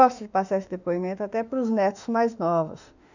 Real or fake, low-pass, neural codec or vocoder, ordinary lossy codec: fake; 7.2 kHz; autoencoder, 48 kHz, 32 numbers a frame, DAC-VAE, trained on Japanese speech; none